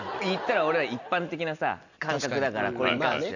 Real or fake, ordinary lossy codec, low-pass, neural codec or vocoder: real; none; 7.2 kHz; none